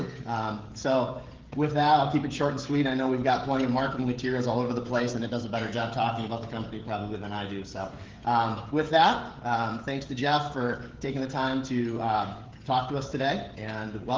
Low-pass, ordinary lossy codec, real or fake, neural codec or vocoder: 7.2 kHz; Opus, 16 kbps; fake; codec, 16 kHz, 16 kbps, FreqCodec, smaller model